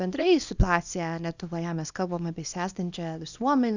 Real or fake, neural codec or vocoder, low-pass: fake; codec, 24 kHz, 0.9 kbps, WavTokenizer, small release; 7.2 kHz